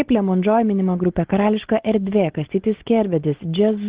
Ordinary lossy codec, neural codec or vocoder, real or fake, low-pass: Opus, 16 kbps; none; real; 3.6 kHz